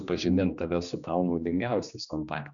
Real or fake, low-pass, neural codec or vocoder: fake; 7.2 kHz; codec, 16 kHz, 2 kbps, X-Codec, HuBERT features, trained on general audio